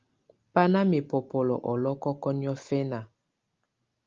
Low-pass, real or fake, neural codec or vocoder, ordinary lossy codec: 7.2 kHz; real; none; Opus, 32 kbps